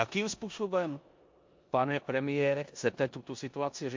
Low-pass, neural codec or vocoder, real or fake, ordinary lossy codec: 7.2 kHz; codec, 16 kHz in and 24 kHz out, 0.9 kbps, LongCat-Audio-Codec, four codebook decoder; fake; MP3, 48 kbps